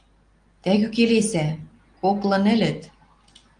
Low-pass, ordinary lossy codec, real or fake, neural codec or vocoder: 9.9 kHz; Opus, 24 kbps; real; none